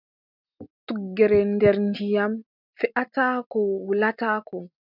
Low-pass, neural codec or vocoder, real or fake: 5.4 kHz; none; real